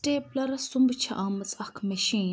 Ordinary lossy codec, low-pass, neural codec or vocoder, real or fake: none; none; none; real